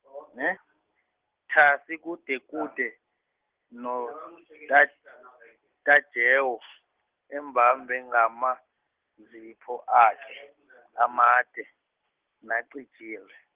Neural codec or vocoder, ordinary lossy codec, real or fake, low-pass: none; Opus, 16 kbps; real; 3.6 kHz